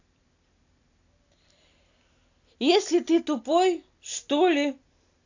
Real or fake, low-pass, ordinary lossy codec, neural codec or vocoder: real; 7.2 kHz; AAC, 48 kbps; none